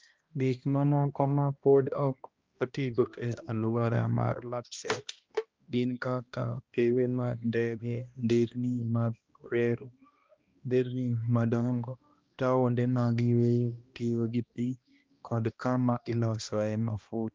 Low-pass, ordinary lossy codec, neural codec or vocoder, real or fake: 7.2 kHz; Opus, 24 kbps; codec, 16 kHz, 1 kbps, X-Codec, HuBERT features, trained on balanced general audio; fake